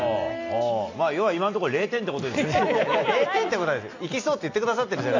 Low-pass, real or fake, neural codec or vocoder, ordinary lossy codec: 7.2 kHz; real; none; AAC, 48 kbps